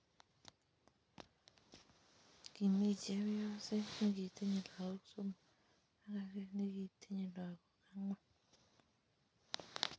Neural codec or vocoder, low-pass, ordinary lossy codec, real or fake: none; none; none; real